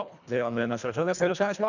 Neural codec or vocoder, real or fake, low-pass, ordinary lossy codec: codec, 24 kHz, 1.5 kbps, HILCodec; fake; 7.2 kHz; none